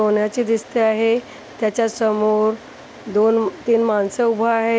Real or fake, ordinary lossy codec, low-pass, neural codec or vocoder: real; none; none; none